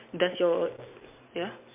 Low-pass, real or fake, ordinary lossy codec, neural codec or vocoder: 3.6 kHz; real; MP3, 32 kbps; none